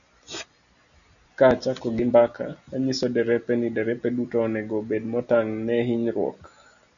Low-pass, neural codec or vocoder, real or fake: 7.2 kHz; none; real